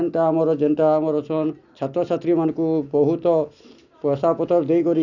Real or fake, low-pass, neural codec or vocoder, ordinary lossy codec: real; 7.2 kHz; none; none